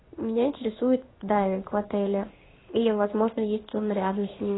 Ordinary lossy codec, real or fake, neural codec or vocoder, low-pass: AAC, 16 kbps; fake; codec, 16 kHz in and 24 kHz out, 1 kbps, XY-Tokenizer; 7.2 kHz